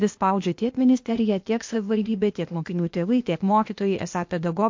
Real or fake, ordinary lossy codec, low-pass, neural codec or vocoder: fake; MP3, 48 kbps; 7.2 kHz; codec, 16 kHz, 0.8 kbps, ZipCodec